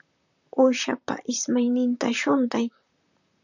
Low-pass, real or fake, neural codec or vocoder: 7.2 kHz; fake; vocoder, 44.1 kHz, 128 mel bands, Pupu-Vocoder